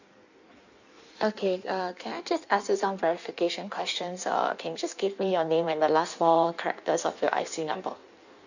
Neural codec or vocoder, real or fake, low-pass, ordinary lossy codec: codec, 16 kHz in and 24 kHz out, 1.1 kbps, FireRedTTS-2 codec; fake; 7.2 kHz; none